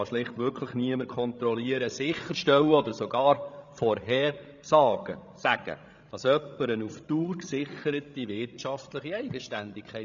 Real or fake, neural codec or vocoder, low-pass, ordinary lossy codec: fake; codec, 16 kHz, 16 kbps, FreqCodec, larger model; 7.2 kHz; none